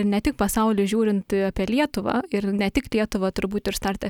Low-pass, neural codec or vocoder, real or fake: 19.8 kHz; none; real